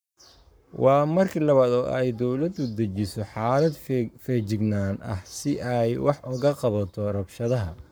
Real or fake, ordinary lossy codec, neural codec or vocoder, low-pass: fake; none; codec, 44.1 kHz, 7.8 kbps, Pupu-Codec; none